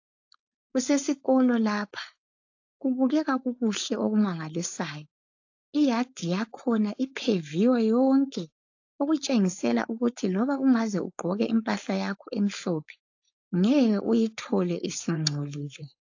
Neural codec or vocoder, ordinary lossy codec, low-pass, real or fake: codec, 16 kHz, 4.8 kbps, FACodec; AAC, 48 kbps; 7.2 kHz; fake